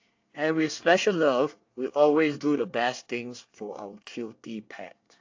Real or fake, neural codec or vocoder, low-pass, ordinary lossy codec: fake; codec, 24 kHz, 1 kbps, SNAC; 7.2 kHz; AAC, 48 kbps